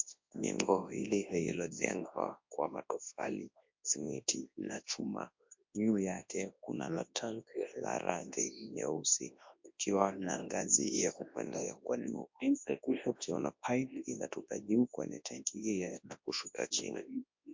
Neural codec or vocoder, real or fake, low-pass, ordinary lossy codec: codec, 24 kHz, 0.9 kbps, WavTokenizer, large speech release; fake; 7.2 kHz; MP3, 48 kbps